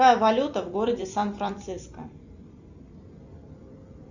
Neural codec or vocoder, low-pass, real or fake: vocoder, 44.1 kHz, 128 mel bands every 256 samples, BigVGAN v2; 7.2 kHz; fake